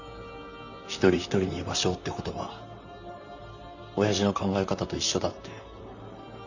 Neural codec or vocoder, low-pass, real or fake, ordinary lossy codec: vocoder, 44.1 kHz, 128 mel bands, Pupu-Vocoder; 7.2 kHz; fake; none